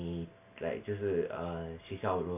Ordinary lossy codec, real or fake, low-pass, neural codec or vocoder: AAC, 32 kbps; real; 3.6 kHz; none